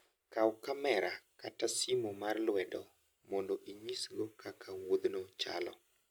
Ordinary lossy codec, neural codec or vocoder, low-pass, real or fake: none; none; none; real